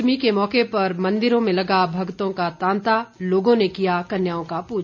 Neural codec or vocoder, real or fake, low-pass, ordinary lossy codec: none; real; 7.2 kHz; none